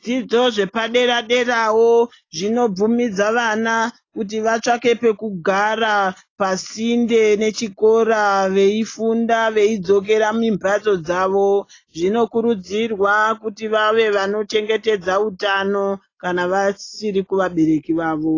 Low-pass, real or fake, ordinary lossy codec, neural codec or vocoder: 7.2 kHz; real; AAC, 32 kbps; none